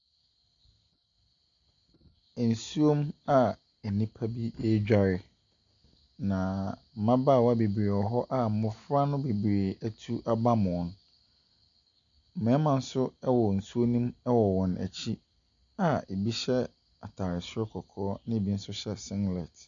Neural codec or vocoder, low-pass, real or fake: none; 7.2 kHz; real